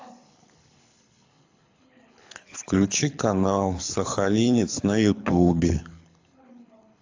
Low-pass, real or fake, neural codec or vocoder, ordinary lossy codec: 7.2 kHz; fake; codec, 24 kHz, 6 kbps, HILCodec; AAC, 48 kbps